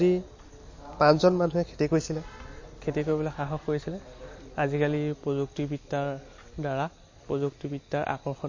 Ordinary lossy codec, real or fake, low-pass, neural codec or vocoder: MP3, 32 kbps; real; 7.2 kHz; none